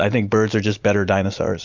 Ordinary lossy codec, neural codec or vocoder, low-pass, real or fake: MP3, 64 kbps; none; 7.2 kHz; real